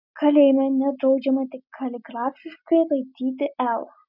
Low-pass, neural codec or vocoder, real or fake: 5.4 kHz; none; real